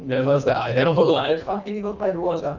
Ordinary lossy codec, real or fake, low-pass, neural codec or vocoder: none; fake; 7.2 kHz; codec, 24 kHz, 1.5 kbps, HILCodec